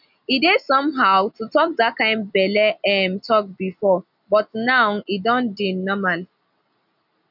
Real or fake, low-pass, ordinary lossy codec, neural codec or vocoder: real; 5.4 kHz; none; none